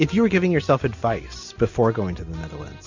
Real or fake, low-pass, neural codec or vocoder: real; 7.2 kHz; none